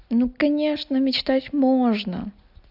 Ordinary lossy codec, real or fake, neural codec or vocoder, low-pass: AAC, 48 kbps; real; none; 5.4 kHz